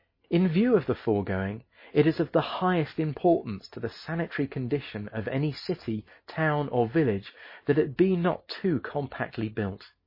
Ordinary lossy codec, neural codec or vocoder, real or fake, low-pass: MP3, 24 kbps; none; real; 5.4 kHz